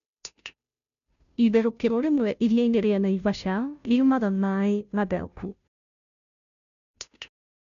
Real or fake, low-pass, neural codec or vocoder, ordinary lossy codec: fake; 7.2 kHz; codec, 16 kHz, 0.5 kbps, FunCodec, trained on Chinese and English, 25 frames a second; none